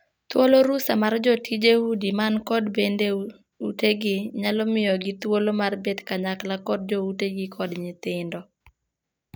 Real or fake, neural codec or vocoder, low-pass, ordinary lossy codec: real; none; none; none